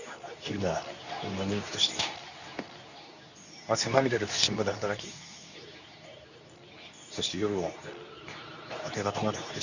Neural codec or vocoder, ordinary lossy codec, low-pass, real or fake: codec, 24 kHz, 0.9 kbps, WavTokenizer, medium speech release version 2; none; 7.2 kHz; fake